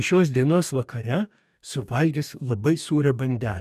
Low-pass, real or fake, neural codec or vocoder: 14.4 kHz; fake; codec, 44.1 kHz, 2.6 kbps, DAC